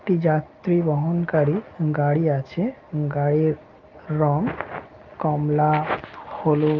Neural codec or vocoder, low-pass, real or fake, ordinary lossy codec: none; 7.2 kHz; real; Opus, 32 kbps